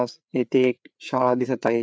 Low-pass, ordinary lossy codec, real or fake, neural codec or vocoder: none; none; fake; codec, 16 kHz, 4 kbps, FreqCodec, larger model